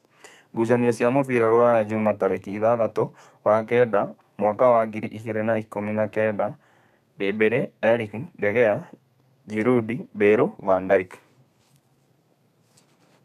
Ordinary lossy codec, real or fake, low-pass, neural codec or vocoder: none; fake; 14.4 kHz; codec, 32 kHz, 1.9 kbps, SNAC